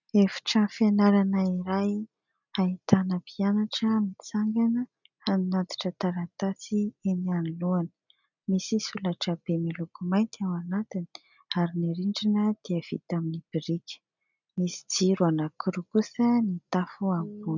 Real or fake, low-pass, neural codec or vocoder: real; 7.2 kHz; none